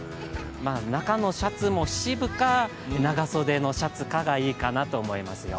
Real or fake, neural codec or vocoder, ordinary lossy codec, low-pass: real; none; none; none